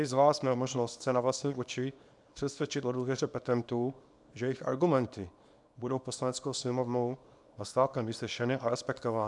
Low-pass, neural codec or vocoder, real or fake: 10.8 kHz; codec, 24 kHz, 0.9 kbps, WavTokenizer, small release; fake